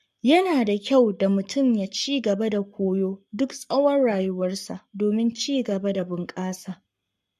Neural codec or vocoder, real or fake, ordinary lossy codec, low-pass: codec, 44.1 kHz, 7.8 kbps, Pupu-Codec; fake; MP3, 64 kbps; 14.4 kHz